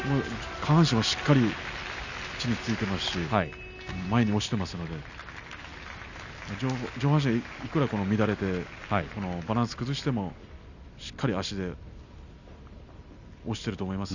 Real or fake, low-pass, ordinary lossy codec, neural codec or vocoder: real; 7.2 kHz; none; none